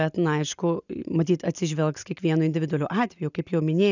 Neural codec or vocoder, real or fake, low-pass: none; real; 7.2 kHz